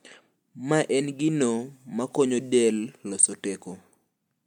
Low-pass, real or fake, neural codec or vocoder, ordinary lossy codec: 19.8 kHz; real; none; MP3, 96 kbps